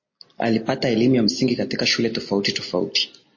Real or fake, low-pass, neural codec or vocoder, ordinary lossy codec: real; 7.2 kHz; none; MP3, 32 kbps